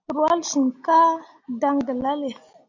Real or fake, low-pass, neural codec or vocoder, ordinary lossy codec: real; 7.2 kHz; none; AAC, 48 kbps